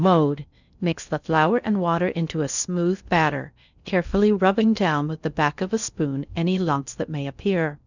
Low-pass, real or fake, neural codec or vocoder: 7.2 kHz; fake; codec, 16 kHz in and 24 kHz out, 0.6 kbps, FocalCodec, streaming, 4096 codes